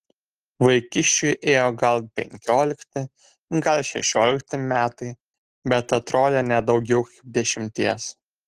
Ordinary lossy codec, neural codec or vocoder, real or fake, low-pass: Opus, 16 kbps; none; real; 14.4 kHz